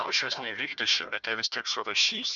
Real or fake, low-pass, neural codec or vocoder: fake; 7.2 kHz; codec, 16 kHz, 1 kbps, FunCodec, trained on Chinese and English, 50 frames a second